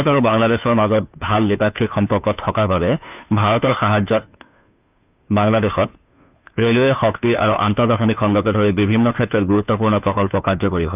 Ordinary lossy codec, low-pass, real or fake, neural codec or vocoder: none; 3.6 kHz; fake; codec, 16 kHz, 2 kbps, FunCodec, trained on Chinese and English, 25 frames a second